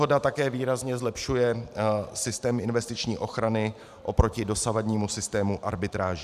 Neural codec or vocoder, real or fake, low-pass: vocoder, 44.1 kHz, 128 mel bands every 512 samples, BigVGAN v2; fake; 14.4 kHz